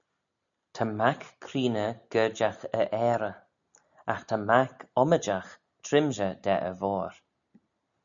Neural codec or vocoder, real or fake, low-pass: none; real; 7.2 kHz